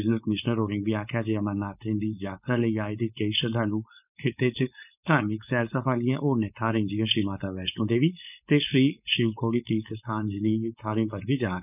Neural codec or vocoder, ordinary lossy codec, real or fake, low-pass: codec, 16 kHz, 4.8 kbps, FACodec; none; fake; 3.6 kHz